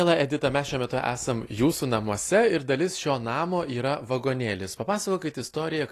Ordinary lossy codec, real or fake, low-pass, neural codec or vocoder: AAC, 48 kbps; real; 14.4 kHz; none